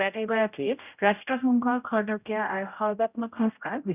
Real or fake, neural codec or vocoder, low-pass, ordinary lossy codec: fake; codec, 16 kHz, 0.5 kbps, X-Codec, HuBERT features, trained on general audio; 3.6 kHz; none